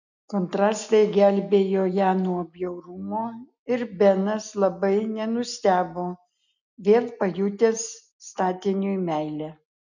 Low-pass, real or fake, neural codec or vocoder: 7.2 kHz; real; none